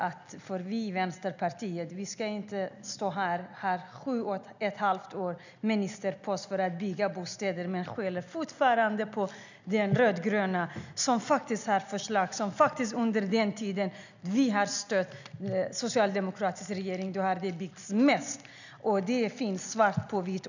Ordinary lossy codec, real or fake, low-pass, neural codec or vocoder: none; real; 7.2 kHz; none